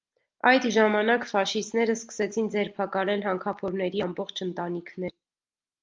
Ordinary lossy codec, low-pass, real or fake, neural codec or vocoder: Opus, 32 kbps; 7.2 kHz; real; none